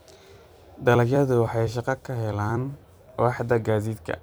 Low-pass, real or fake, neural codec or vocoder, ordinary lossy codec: none; fake; vocoder, 44.1 kHz, 128 mel bands every 256 samples, BigVGAN v2; none